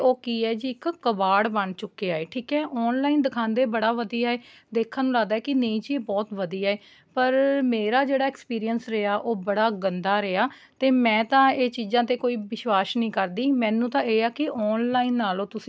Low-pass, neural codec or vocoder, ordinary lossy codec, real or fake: none; none; none; real